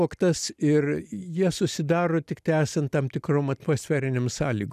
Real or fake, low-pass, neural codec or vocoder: real; 14.4 kHz; none